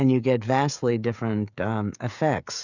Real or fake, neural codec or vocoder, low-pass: fake; codec, 16 kHz, 16 kbps, FreqCodec, smaller model; 7.2 kHz